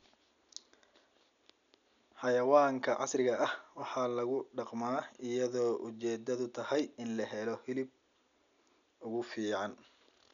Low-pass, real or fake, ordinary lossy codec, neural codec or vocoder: 7.2 kHz; real; none; none